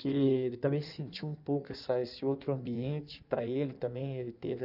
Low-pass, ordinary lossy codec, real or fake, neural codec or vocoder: 5.4 kHz; none; fake; codec, 16 kHz in and 24 kHz out, 1.1 kbps, FireRedTTS-2 codec